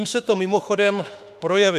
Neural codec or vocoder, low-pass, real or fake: autoencoder, 48 kHz, 32 numbers a frame, DAC-VAE, trained on Japanese speech; 14.4 kHz; fake